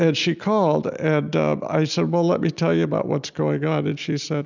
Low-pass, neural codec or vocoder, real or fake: 7.2 kHz; none; real